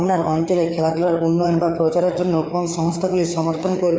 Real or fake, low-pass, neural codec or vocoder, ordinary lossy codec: fake; none; codec, 16 kHz, 4 kbps, FreqCodec, larger model; none